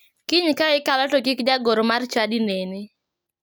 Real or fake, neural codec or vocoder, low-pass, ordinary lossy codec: real; none; none; none